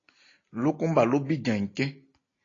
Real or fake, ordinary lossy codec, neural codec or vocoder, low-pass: real; AAC, 32 kbps; none; 7.2 kHz